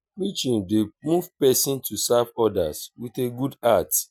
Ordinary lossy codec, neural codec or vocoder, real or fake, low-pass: none; none; real; none